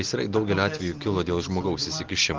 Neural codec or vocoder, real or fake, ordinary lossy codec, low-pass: none; real; Opus, 16 kbps; 7.2 kHz